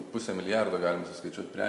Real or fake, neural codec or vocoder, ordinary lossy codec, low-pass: real; none; MP3, 48 kbps; 14.4 kHz